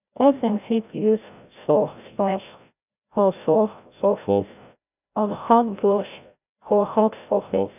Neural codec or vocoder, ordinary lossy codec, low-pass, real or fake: codec, 16 kHz, 0.5 kbps, FreqCodec, larger model; none; 3.6 kHz; fake